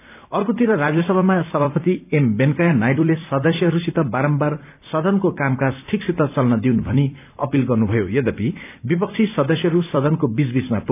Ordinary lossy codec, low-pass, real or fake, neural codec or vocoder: none; 3.6 kHz; fake; vocoder, 44.1 kHz, 128 mel bands every 256 samples, BigVGAN v2